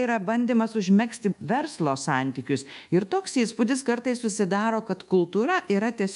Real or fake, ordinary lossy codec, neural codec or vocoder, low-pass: fake; MP3, 96 kbps; codec, 24 kHz, 1.2 kbps, DualCodec; 10.8 kHz